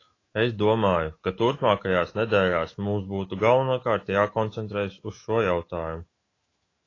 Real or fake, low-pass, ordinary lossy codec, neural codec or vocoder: fake; 7.2 kHz; AAC, 32 kbps; autoencoder, 48 kHz, 128 numbers a frame, DAC-VAE, trained on Japanese speech